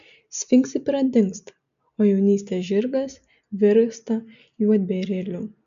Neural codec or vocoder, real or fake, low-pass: none; real; 7.2 kHz